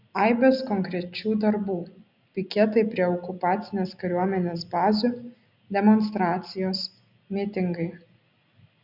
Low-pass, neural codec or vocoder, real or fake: 5.4 kHz; none; real